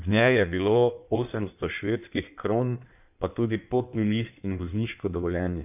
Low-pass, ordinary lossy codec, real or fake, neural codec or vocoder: 3.6 kHz; none; fake; codec, 16 kHz in and 24 kHz out, 1.1 kbps, FireRedTTS-2 codec